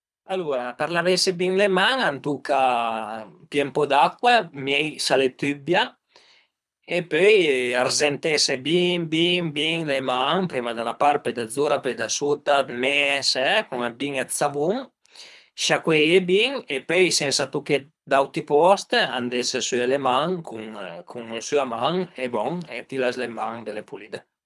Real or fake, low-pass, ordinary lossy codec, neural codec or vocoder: fake; none; none; codec, 24 kHz, 3 kbps, HILCodec